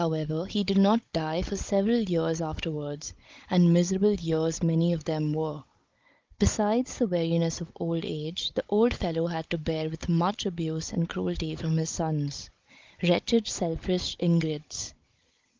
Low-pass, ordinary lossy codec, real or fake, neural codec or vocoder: 7.2 kHz; Opus, 32 kbps; fake; codec, 16 kHz, 16 kbps, FunCodec, trained on Chinese and English, 50 frames a second